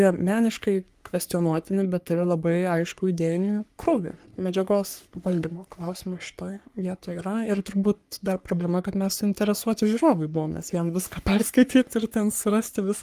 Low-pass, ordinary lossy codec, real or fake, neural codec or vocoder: 14.4 kHz; Opus, 32 kbps; fake; codec, 44.1 kHz, 3.4 kbps, Pupu-Codec